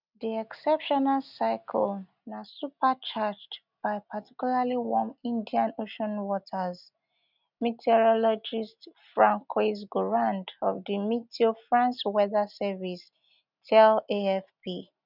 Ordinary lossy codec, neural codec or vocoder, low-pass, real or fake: none; none; 5.4 kHz; real